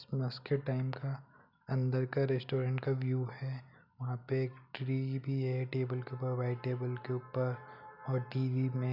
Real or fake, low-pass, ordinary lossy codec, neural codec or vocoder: real; 5.4 kHz; none; none